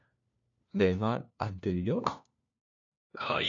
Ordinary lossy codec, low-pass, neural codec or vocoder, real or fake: AAC, 48 kbps; 7.2 kHz; codec, 16 kHz, 1 kbps, FunCodec, trained on LibriTTS, 50 frames a second; fake